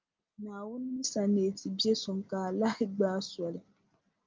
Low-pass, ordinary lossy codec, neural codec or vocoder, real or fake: 7.2 kHz; Opus, 24 kbps; none; real